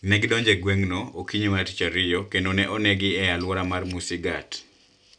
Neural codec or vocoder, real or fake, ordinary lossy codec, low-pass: none; real; none; 9.9 kHz